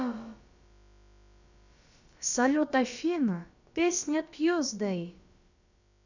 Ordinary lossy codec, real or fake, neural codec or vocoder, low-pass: none; fake; codec, 16 kHz, about 1 kbps, DyCAST, with the encoder's durations; 7.2 kHz